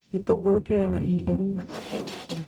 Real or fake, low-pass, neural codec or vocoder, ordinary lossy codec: fake; 19.8 kHz; codec, 44.1 kHz, 0.9 kbps, DAC; none